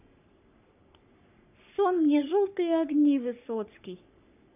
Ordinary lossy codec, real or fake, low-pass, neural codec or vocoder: none; fake; 3.6 kHz; codec, 44.1 kHz, 3.4 kbps, Pupu-Codec